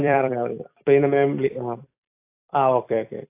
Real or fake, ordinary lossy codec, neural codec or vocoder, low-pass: fake; AAC, 24 kbps; vocoder, 44.1 kHz, 128 mel bands every 256 samples, BigVGAN v2; 3.6 kHz